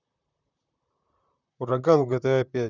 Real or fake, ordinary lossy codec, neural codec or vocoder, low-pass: fake; none; vocoder, 44.1 kHz, 128 mel bands, Pupu-Vocoder; 7.2 kHz